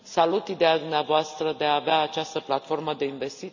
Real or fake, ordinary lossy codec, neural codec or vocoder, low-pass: real; none; none; 7.2 kHz